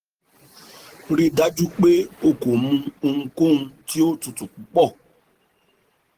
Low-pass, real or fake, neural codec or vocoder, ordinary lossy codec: 14.4 kHz; real; none; Opus, 16 kbps